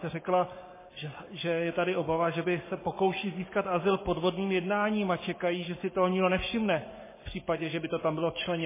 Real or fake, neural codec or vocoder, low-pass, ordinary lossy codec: fake; codec, 44.1 kHz, 7.8 kbps, Pupu-Codec; 3.6 kHz; MP3, 16 kbps